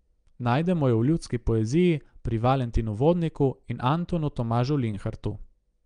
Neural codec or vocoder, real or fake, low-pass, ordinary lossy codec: none; real; 9.9 kHz; Opus, 32 kbps